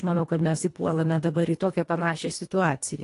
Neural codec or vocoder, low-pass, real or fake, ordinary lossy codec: codec, 24 kHz, 1.5 kbps, HILCodec; 10.8 kHz; fake; AAC, 48 kbps